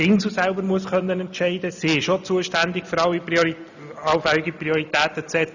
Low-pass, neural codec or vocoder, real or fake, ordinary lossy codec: 7.2 kHz; none; real; none